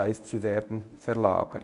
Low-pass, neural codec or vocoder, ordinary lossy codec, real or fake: 10.8 kHz; codec, 24 kHz, 0.9 kbps, WavTokenizer, medium speech release version 1; none; fake